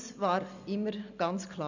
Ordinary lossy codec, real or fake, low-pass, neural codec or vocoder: none; real; 7.2 kHz; none